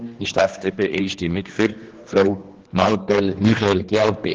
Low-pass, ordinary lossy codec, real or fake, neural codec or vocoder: 7.2 kHz; Opus, 16 kbps; fake; codec, 16 kHz, 2 kbps, X-Codec, HuBERT features, trained on general audio